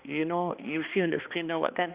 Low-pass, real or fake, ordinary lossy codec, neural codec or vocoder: 3.6 kHz; fake; Opus, 64 kbps; codec, 16 kHz, 2 kbps, X-Codec, HuBERT features, trained on balanced general audio